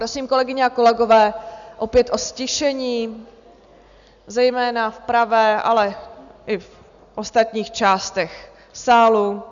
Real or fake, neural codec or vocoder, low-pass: real; none; 7.2 kHz